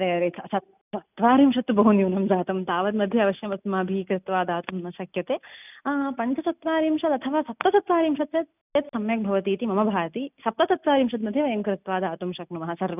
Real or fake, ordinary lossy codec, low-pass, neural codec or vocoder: real; none; 3.6 kHz; none